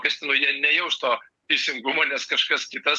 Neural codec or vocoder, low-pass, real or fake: none; 10.8 kHz; real